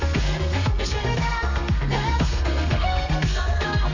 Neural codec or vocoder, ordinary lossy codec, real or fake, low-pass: codec, 32 kHz, 1.9 kbps, SNAC; MP3, 64 kbps; fake; 7.2 kHz